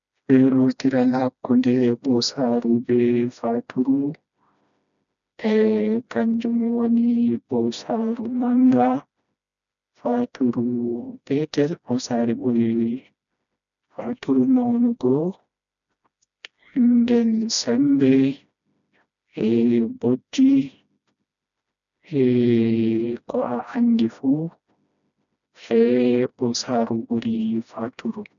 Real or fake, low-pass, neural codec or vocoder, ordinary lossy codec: fake; 7.2 kHz; codec, 16 kHz, 1 kbps, FreqCodec, smaller model; none